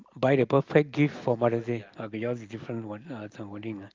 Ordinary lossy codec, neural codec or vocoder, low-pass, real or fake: Opus, 32 kbps; none; 7.2 kHz; real